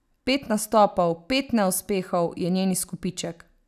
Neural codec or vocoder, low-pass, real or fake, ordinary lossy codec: none; 14.4 kHz; real; none